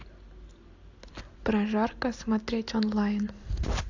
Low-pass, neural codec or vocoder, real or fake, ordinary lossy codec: 7.2 kHz; none; real; AAC, 48 kbps